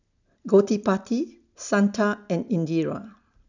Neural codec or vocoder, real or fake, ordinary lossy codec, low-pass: none; real; none; 7.2 kHz